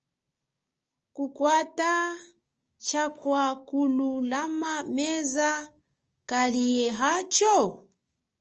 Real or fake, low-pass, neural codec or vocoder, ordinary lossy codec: fake; 7.2 kHz; codec, 16 kHz, 6 kbps, DAC; Opus, 24 kbps